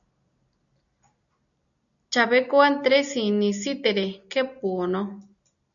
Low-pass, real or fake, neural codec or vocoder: 7.2 kHz; real; none